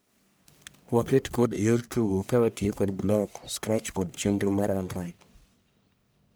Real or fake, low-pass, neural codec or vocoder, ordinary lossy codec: fake; none; codec, 44.1 kHz, 1.7 kbps, Pupu-Codec; none